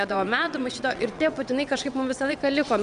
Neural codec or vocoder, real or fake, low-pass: vocoder, 22.05 kHz, 80 mel bands, WaveNeXt; fake; 9.9 kHz